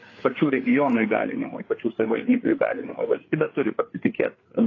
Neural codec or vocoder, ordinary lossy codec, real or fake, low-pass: codec, 16 kHz, 4 kbps, FreqCodec, larger model; AAC, 32 kbps; fake; 7.2 kHz